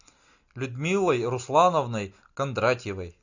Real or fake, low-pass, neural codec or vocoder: real; 7.2 kHz; none